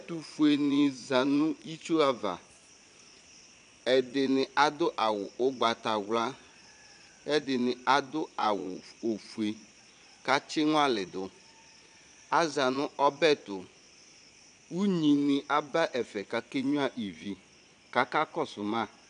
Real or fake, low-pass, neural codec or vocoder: fake; 9.9 kHz; vocoder, 22.05 kHz, 80 mel bands, Vocos